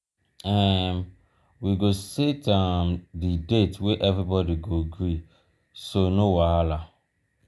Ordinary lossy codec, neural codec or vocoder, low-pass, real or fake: none; none; none; real